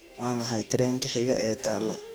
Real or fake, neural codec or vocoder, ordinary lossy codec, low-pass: fake; codec, 44.1 kHz, 2.6 kbps, DAC; none; none